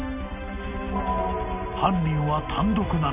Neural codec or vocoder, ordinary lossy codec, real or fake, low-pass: none; none; real; 3.6 kHz